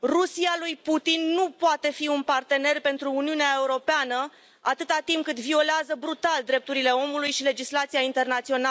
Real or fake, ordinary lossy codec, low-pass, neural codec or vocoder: real; none; none; none